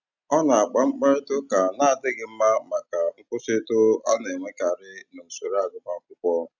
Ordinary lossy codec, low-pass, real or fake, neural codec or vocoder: none; 7.2 kHz; real; none